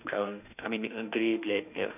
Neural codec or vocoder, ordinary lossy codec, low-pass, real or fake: autoencoder, 48 kHz, 32 numbers a frame, DAC-VAE, trained on Japanese speech; none; 3.6 kHz; fake